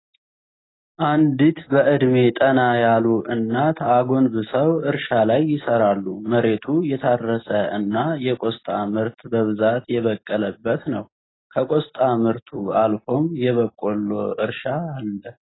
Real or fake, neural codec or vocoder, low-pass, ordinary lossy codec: real; none; 7.2 kHz; AAC, 16 kbps